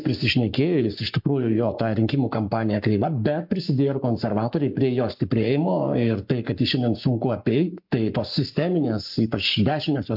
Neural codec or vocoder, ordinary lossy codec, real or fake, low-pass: codec, 44.1 kHz, 7.8 kbps, Pupu-Codec; MP3, 48 kbps; fake; 5.4 kHz